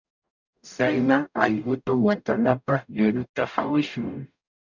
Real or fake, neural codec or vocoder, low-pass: fake; codec, 44.1 kHz, 0.9 kbps, DAC; 7.2 kHz